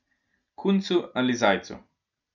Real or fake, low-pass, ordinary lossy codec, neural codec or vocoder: real; 7.2 kHz; none; none